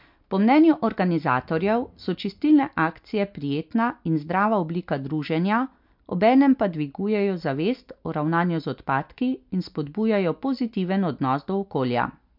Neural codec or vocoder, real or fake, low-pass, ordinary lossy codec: none; real; 5.4 kHz; MP3, 48 kbps